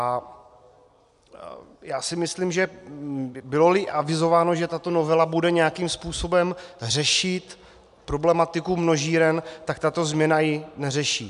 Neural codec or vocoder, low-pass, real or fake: none; 10.8 kHz; real